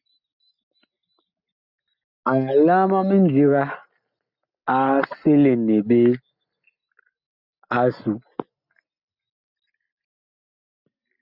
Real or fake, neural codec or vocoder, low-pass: real; none; 5.4 kHz